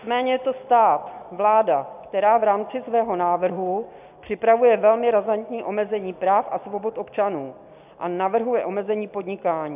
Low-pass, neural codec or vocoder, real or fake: 3.6 kHz; none; real